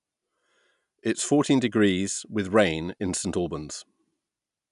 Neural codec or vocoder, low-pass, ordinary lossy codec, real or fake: none; 10.8 kHz; none; real